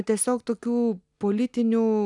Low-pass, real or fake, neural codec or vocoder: 10.8 kHz; real; none